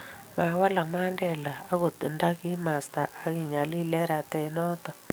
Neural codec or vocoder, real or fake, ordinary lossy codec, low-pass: codec, 44.1 kHz, 7.8 kbps, DAC; fake; none; none